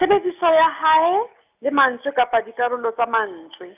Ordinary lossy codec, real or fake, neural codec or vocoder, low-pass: none; real; none; 3.6 kHz